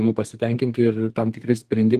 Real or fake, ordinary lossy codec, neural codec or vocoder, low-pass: fake; Opus, 24 kbps; codec, 32 kHz, 1.9 kbps, SNAC; 14.4 kHz